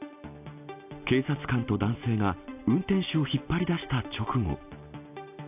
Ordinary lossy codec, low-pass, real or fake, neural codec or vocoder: AAC, 32 kbps; 3.6 kHz; real; none